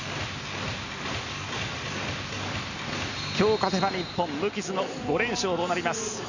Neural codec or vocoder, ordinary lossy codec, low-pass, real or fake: none; none; 7.2 kHz; real